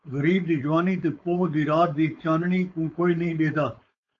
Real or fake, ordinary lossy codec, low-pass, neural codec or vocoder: fake; AAC, 48 kbps; 7.2 kHz; codec, 16 kHz, 4.8 kbps, FACodec